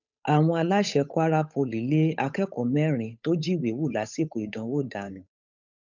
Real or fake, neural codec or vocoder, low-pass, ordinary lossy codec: fake; codec, 16 kHz, 8 kbps, FunCodec, trained on Chinese and English, 25 frames a second; 7.2 kHz; none